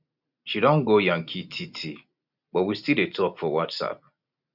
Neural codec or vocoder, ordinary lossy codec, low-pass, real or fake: none; none; 5.4 kHz; real